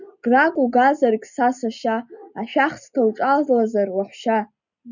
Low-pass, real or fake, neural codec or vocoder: 7.2 kHz; real; none